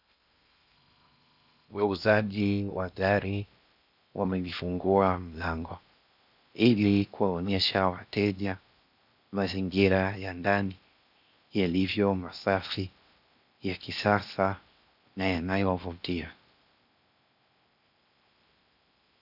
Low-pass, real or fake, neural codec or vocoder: 5.4 kHz; fake; codec, 16 kHz in and 24 kHz out, 0.6 kbps, FocalCodec, streaming, 2048 codes